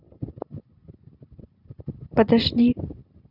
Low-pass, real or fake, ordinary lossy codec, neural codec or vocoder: 5.4 kHz; real; none; none